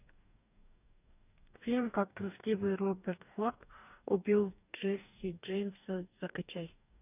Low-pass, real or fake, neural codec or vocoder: 3.6 kHz; fake; codec, 44.1 kHz, 2.6 kbps, DAC